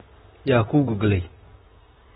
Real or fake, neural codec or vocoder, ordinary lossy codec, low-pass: fake; vocoder, 44.1 kHz, 128 mel bands, Pupu-Vocoder; AAC, 16 kbps; 19.8 kHz